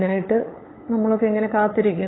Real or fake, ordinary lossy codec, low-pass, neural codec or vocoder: fake; AAC, 16 kbps; 7.2 kHz; codec, 16 kHz, 8 kbps, FunCodec, trained on LibriTTS, 25 frames a second